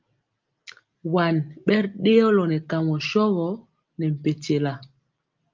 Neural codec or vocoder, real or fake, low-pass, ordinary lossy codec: none; real; 7.2 kHz; Opus, 32 kbps